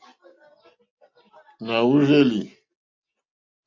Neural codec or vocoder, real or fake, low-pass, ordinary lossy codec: none; real; 7.2 kHz; AAC, 48 kbps